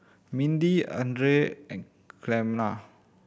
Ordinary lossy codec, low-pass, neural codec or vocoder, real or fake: none; none; none; real